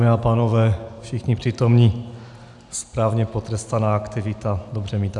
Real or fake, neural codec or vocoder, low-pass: real; none; 10.8 kHz